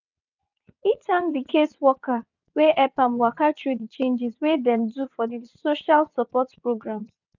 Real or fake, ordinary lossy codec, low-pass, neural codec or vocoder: fake; none; 7.2 kHz; vocoder, 22.05 kHz, 80 mel bands, WaveNeXt